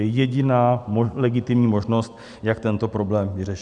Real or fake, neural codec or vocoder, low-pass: real; none; 10.8 kHz